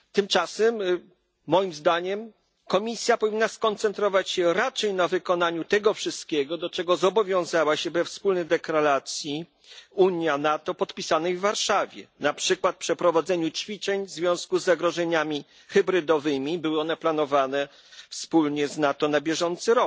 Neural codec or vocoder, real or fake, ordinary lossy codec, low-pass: none; real; none; none